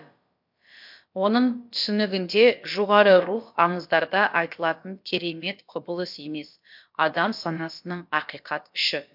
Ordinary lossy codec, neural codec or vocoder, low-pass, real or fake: MP3, 48 kbps; codec, 16 kHz, about 1 kbps, DyCAST, with the encoder's durations; 5.4 kHz; fake